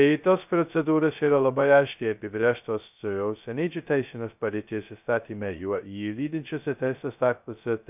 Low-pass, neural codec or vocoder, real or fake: 3.6 kHz; codec, 16 kHz, 0.2 kbps, FocalCodec; fake